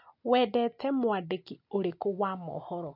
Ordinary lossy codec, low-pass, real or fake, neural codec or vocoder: none; 5.4 kHz; real; none